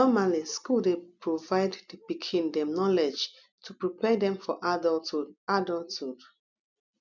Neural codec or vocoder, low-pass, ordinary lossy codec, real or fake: none; 7.2 kHz; none; real